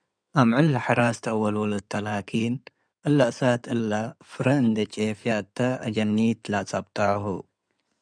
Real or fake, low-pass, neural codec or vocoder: fake; 9.9 kHz; codec, 16 kHz in and 24 kHz out, 2.2 kbps, FireRedTTS-2 codec